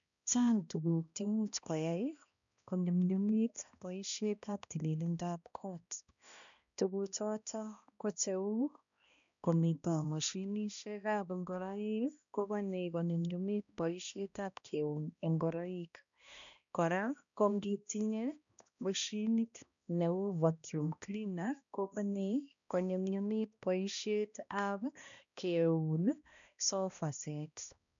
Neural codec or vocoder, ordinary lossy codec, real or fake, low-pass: codec, 16 kHz, 1 kbps, X-Codec, HuBERT features, trained on balanced general audio; MP3, 96 kbps; fake; 7.2 kHz